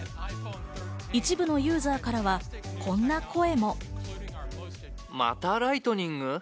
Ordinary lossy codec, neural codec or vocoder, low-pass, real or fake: none; none; none; real